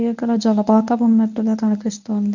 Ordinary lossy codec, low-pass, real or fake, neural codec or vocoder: none; 7.2 kHz; fake; codec, 24 kHz, 0.9 kbps, WavTokenizer, medium speech release version 1